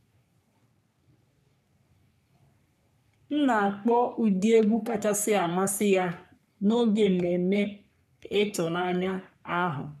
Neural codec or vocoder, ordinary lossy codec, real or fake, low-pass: codec, 44.1 kHz, 3.4 kbps, Pupu-Codec; none; fake; 14.4 kHz